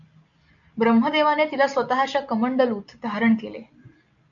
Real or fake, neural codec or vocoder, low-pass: real; none; 7.2 kHz